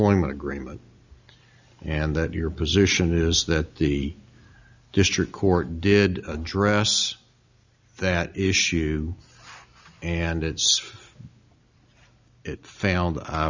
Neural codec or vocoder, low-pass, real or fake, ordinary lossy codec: none; 7.2 kHz; real; Opus, 64 kbps